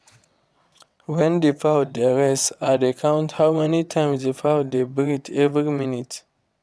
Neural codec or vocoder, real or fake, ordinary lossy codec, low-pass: vocoder, 22.05 kHz, 80 mel bands, WaveNeXt; fake; none; none